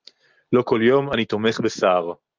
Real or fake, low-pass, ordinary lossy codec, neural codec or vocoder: real; 7.2 kHz; Opus, 32 kbps; none